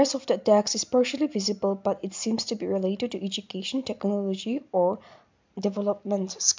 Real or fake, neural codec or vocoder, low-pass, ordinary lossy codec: real; none; 7.2 kHz; MP3, 64 kbps